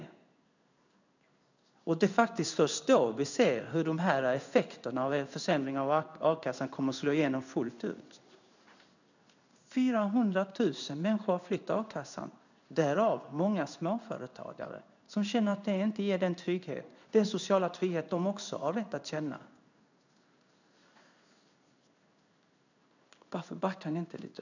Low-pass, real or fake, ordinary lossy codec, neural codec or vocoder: 7.2 kHz; fake; none; codec, 16 kHz in and 24 kHz out, 1 kbps, XY-Tokenizer